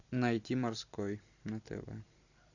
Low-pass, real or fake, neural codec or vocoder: 7.2 kHz; fake; vocoder, 44.1 kHz, 128 mel bands every 256 samples, BigVGAN v2